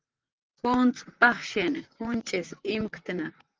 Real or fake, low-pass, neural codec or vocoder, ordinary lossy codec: fake; 7.2 kHz; vocoder, 44.1 kHz, 128 mel bands, Pupu-Vocoder; Opus, 24 kbps